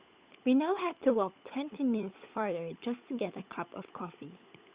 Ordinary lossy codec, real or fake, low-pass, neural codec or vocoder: Opus, 64 kbps; fake; 3.6 kHz; codec, 16 kHz, 16 kbps, FunCodec, trained on LibriTTS, 50 frames a second